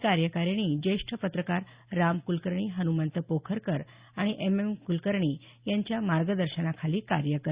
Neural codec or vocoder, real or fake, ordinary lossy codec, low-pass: none; real; Opus, 64 kbps; 3.6 kHz